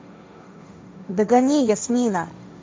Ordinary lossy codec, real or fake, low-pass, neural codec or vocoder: none; fake; none; codec, 16 kHz, 1.1 kbps, Voila-Tokenizer